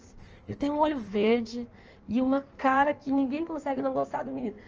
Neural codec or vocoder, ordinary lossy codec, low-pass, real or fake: codec, 16 kHz in and 24 kHz out, 1.1 kbps, FireRedTTS-2 codec; Opus, 24 kbps; 7.2 kHz; fake